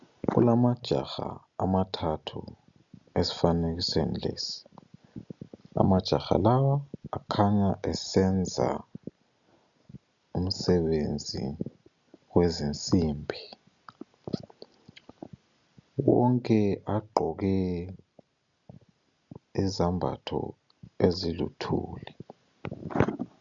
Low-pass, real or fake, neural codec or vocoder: 7.2 kHz; real; none